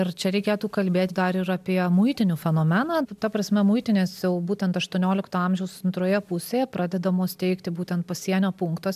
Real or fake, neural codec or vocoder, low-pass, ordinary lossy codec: real; none; 14.4 kHz; MP3, 96 kbps